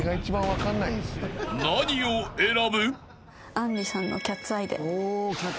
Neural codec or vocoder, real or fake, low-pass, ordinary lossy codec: none; real; none; none